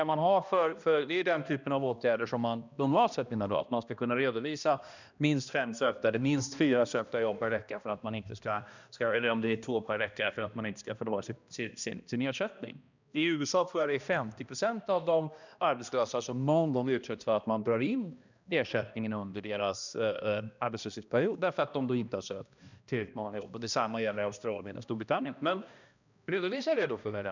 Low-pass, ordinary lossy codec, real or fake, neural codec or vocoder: 7.2 kHz; none; fake; codec, 16 kHz, 1 kbps, X-Codec, HuBERT features, trained on balanced general audio